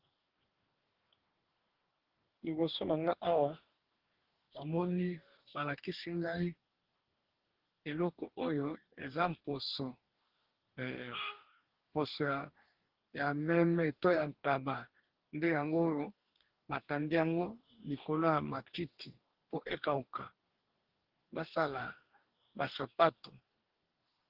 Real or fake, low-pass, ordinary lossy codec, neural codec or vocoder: fake; 5.4 kHz; Opus, 16 kbps; codec, 44.1 kHz, 2.6 kbps, DAC